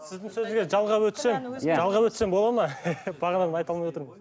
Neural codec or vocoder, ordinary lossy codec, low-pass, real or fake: none; none; none; real